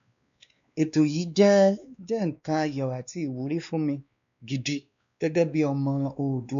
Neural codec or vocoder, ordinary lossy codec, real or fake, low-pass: codec, 16 kHz, 2 kbps, X-Codec, WavLM features, trained on Multilingual LibriSpeech; AAC, 96 kbps; fake; 7.2 kHz